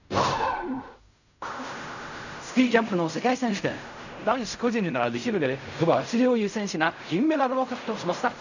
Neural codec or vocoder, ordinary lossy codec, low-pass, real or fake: codec, 16 kHz in and 24 kHz out, 0.4 kbps, LongCat-Audio-Codec, fine tuned four codebook decoder; none; 7.2 kHz; fake